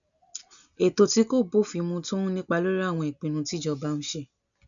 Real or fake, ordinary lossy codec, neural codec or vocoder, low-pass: real; none; none; 7.2 kHz